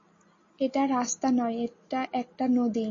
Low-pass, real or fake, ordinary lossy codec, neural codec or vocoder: 7.2 kHz; real; AAC, 32 kbps; none